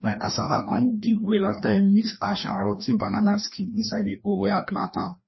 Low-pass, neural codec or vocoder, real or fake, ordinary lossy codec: 7.2 kHz; codec, 16 kHz, 1 kbps, FreqCodec, larger model; fake; MP3, 24 kbps